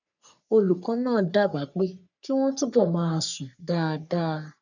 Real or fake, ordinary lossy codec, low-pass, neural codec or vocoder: fake; none; 7.2 kHz; codec, 44.1 kHz, 3.4 kbps, Pupu-Codec